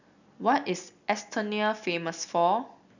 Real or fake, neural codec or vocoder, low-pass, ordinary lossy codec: real; none; 7.2 kHz; none